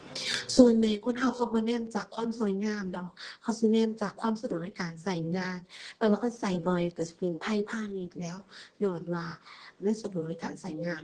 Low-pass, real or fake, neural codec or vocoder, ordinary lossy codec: 10.8 kHz; fake; codec, 24 kHz, 0.9 kbps, WavTokenizer, medium music audio release; Opus, 16 kbps